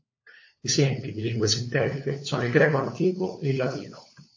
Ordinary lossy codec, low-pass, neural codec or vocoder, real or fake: MP3, 32 kbps; 7.2 kHz; codec, 16 kHz, 4 kbps, FunCodec, trained on LibriTTS, 50 frames a second; fake